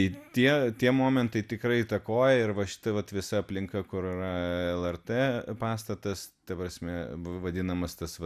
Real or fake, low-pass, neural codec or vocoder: real; 14.4 kHz; none